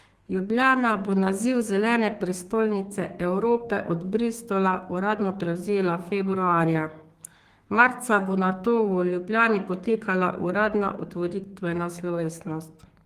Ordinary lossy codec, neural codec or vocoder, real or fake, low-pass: Opus, 24 kbps; codec, 32 kHz, 1.9 kbps, SNAC; fake; 14.4 kHz